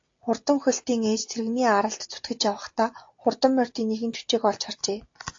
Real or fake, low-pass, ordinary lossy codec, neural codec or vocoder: real; 7.2 kHz; AAC, 48 kbps; none